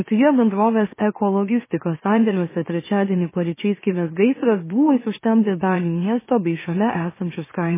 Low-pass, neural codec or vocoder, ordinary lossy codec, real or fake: 3.6 kHz; autoencoder, 44.1 kHz, a latent of 192 numbers a frame, MeloTTS; MP3, 16 kbps; fake